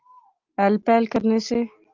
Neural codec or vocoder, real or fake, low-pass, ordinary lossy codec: none; real; 7.2 kHz; Opus, 24 kbps